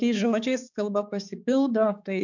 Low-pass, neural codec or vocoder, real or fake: 7.2 kHz; codec, 16 kHz, 4 kbps, X-Codec, HuBERT features, trained on balanced general audio; fake